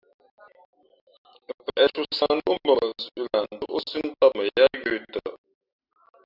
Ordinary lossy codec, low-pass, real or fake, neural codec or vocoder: Opus, 64 kbps; 5.4 kHz; real; none